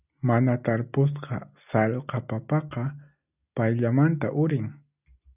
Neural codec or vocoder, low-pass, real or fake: none; 3.6 kHz; real